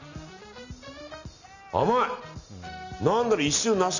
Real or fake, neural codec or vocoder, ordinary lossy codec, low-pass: real; none; none; 7.2 kHz